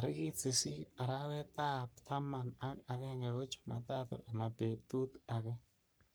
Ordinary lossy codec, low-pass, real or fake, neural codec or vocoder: none; none; fake; codec, 44.1 kHz, 3.4 kbps, Pupu-Codec